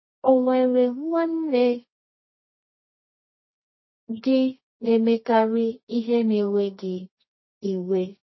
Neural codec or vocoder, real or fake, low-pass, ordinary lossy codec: codec, 24 kHz, 0.9 kbps, WavTokenizer, medium music audio release; fake; 7.2 kHz; MP3, 24 kbps